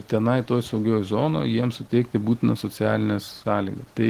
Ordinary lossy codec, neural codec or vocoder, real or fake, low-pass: Opus, 16 kbps; none; real; 14.4 kHz